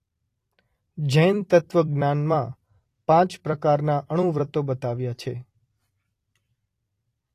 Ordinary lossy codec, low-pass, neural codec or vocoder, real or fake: AAC, 48 kbps; 14.4 kHz; vocoder, 44.1 kHz, 128 mel bands every 256 samples, BigVGAN v2; fake